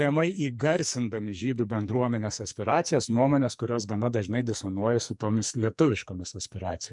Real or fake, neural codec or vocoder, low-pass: fake; codec, 44.1 kHz, 2.6 kbps, SNAC; 10.8 kHz